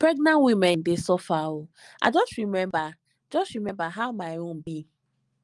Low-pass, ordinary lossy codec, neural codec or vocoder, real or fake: 10.8 kHz; Opus, 32 kbps; none; real